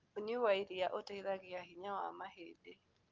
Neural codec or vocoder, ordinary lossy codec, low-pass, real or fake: none; Opus, 32 kbps; 7.2 kHz; real